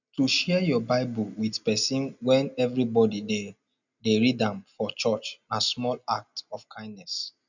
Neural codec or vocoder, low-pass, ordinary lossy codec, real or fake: none; 7.2 kHz; none; real